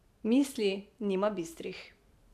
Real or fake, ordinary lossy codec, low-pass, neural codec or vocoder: fake; none; 14.4 kHz; vocoder, 44.1 kHz, 128 mel bands every 512 samples, BigVGAN v2